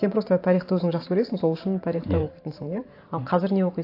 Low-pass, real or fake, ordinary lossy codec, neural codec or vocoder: 5.4 kHz; fake; AAC, 32 kbps; vocoder, 44.1 kHz, 128 mel bands every 512 samples, BigVGAN v2